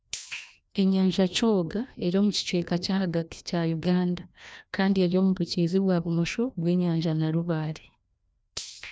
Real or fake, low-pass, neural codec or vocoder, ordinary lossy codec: fake; none; codec, 16 kHz, 1 kbps, FreqCodec, larger model; none